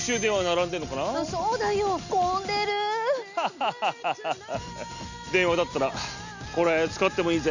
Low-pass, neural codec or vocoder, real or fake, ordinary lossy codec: 7.2 kHz; none; real; none